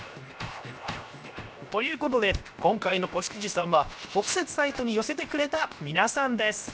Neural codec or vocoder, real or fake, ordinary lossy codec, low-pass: codec, 16 kHz, 0.7 kbps, FocalCodec; fake; none; none